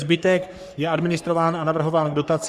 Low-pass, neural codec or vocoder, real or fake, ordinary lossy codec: 14.4 kHz; codec, 44.1 kHz, 3.4 kbps, Pupu-Codec; fake; Opus, 64 kbps